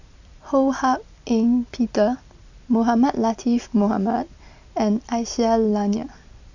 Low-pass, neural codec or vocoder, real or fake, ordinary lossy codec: 7.2 kHz; vocoder, 44.1 kHz, 128 mel bands every 256 samples, BigVGAN v2; fake; none